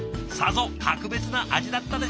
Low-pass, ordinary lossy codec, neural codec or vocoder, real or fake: none; none; none; real